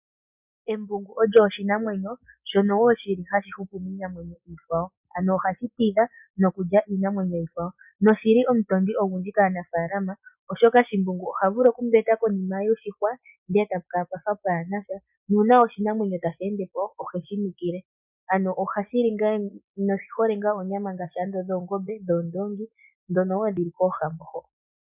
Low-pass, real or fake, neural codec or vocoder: 3.6 kHz; real; none